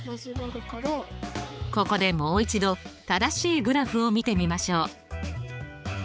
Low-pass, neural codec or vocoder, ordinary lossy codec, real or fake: none; codec, 16 kHz, 4 kbps, X-Codec, HuBERT features, trained on balanced general audio; none; fake